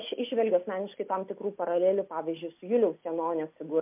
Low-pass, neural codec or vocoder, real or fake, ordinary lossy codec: 3.6 kHz; none; real; MP3, 32 kbps